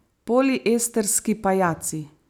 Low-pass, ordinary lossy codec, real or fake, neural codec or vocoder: none; none; real; none